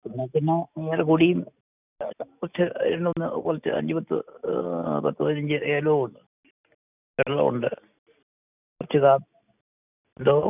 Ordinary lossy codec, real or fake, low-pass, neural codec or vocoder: none; real; 3.6 kHz; none